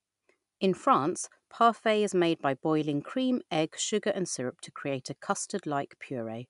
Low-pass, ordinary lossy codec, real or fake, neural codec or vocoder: 10.8 kHz; MP3, 96 kbps; real; none